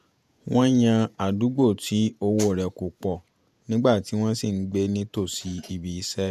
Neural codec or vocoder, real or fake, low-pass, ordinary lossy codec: vocoder, 48 kHz, 128 mel bands, Vocos; fake; 14.4 kHz; none